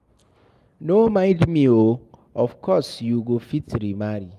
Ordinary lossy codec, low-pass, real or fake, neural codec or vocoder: Opus, 32 kbps; 14.4 kHz; real; none